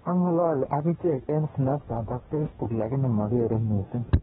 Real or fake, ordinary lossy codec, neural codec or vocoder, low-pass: fake; AAC, 16 kbps; codec, 44.1 kHz, 2.6 kbps, DAC; 19.8 kHz